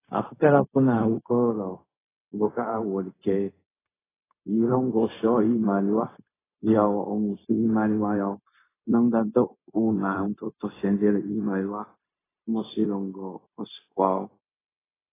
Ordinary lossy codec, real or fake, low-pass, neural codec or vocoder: AAC, 16 kbps; fake; 3.6 kHz; codec, 16 kHz, 0.4 kbps, LongCat-Audio-Codec